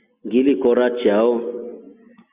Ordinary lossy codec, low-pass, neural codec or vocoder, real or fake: Opus, 64 kbps; 3.6 kHz; none; real